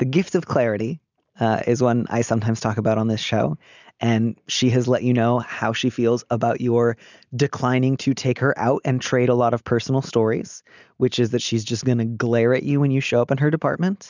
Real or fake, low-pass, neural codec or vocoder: real; 7.2 kHz; none